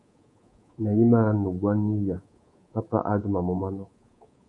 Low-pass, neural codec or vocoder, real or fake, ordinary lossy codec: 10.8 kHz; codec, 24 kHz, 3.1 kbps, DualCodec; fake; MP3, 48 kbps